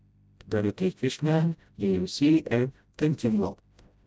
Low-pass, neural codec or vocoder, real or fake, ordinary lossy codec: none; codec, 16 kHz, 0.5 kbps, FreqCodec, smaller model; fake; none